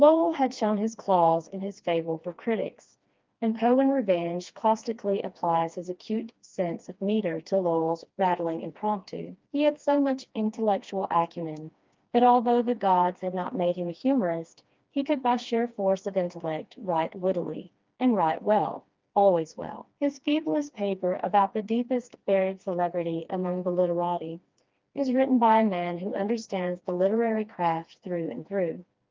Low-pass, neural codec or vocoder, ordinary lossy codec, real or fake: 7.2 kHz; codec, 16 kHz, 2 kbps, FreqCodec, smaller model; Opus, 32 kbps; fake